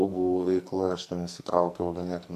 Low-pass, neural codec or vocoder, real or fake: 14.4 kHz; codec, 44.1 kHz, 2.6 kbps, SNAC; fake